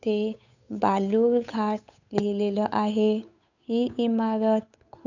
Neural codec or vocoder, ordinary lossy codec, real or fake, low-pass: codec, 16 kHz, 8 kbps, FunCodec, trained on Chinese and English, 25 frames a second; none; fake; 7.2 kHz